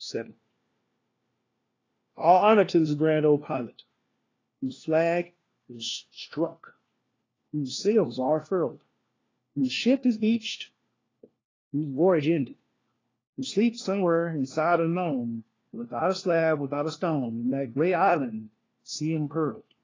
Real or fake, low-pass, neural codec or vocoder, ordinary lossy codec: fake; 7.2 kHz; codec, 16 kHz, 1 kbps, FunCodec, trained on LibriTTS, 50 frames a second; AAC, 32 kbps